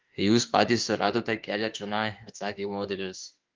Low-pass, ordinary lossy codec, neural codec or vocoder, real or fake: 7.2 kHz; Opus, 32 kbps; autoencoder, 48 kHz, 32 numbers a frame, DAC-VAE, trained on Japanese speech; fake